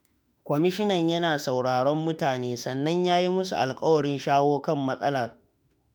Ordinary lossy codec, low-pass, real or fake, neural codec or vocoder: none; none; fake; autoencoder, 48 kHz, 32 numbers a frame, DAC-VAE, trained on Japanese speech